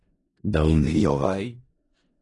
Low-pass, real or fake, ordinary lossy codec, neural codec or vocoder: 10.8 kHz; fake; AAC, 32 kbps; codec, 16 kHz in and 24 kHz out, 0.4 kbps, LongCat-Audio-Codec, four codebook decoder